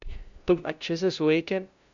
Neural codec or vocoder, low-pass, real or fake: codec, 16 kHz, 0.5 kbps, FunCodec, trained on LibriTTS, 25 frames a second; 7.2 kHz; fake